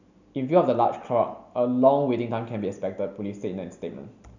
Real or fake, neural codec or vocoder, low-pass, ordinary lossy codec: real; none; 7.2 kHz; none